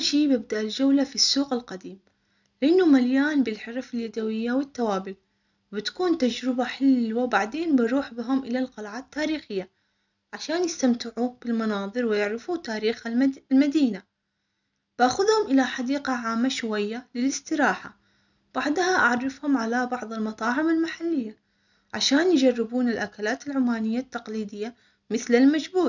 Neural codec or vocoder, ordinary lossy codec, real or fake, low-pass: none; none; real; 7.2 kHz